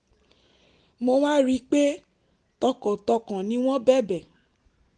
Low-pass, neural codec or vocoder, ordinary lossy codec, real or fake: 10.8 kHz; none; Opus, 24 kbps; real